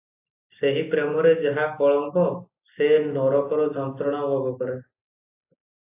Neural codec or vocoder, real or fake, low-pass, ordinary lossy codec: none; real; 3.6 kHz; AAC, 24 kbps